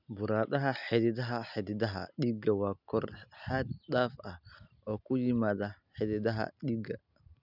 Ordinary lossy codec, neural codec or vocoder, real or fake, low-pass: none; none; real; 5.4 kHz